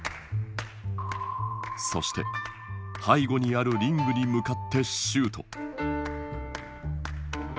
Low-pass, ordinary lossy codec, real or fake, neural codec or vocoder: none; none; real; none